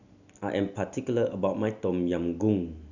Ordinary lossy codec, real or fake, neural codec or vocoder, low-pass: none; real; none; 7.2 kHz